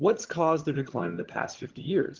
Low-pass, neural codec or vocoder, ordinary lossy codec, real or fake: 7.2 kHz; vocoder, 22.05 kHz, 80 mel bands, HiFi-GAN; Opus, 16 kbps; fake